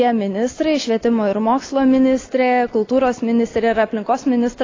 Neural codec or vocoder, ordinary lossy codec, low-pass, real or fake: none; AAC, 32 kbps; 7.2 kHz; real